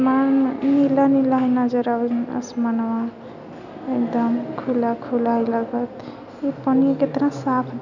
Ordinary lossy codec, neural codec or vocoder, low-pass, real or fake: none; none; 7.2 kHz; real